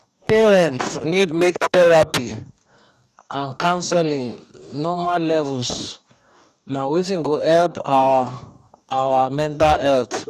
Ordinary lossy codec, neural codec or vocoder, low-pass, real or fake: none; codec, 44.1 kHz, 2.6 kbps, DAC; 14.4 kHz; fake